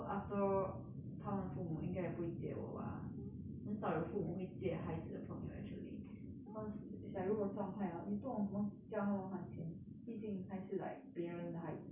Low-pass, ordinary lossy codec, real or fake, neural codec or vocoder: 3.6 kHz; none; real; none